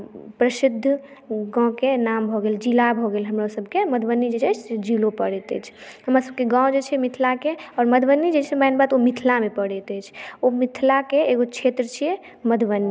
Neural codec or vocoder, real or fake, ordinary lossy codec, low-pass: none; real; none; none